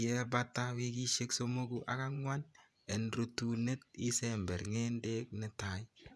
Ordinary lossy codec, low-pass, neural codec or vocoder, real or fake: none; none; vocoder, 24 kHz, 100 mel bands, Vocos; fake